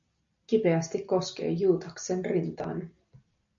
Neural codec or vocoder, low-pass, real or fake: none; 7.2 kHz; real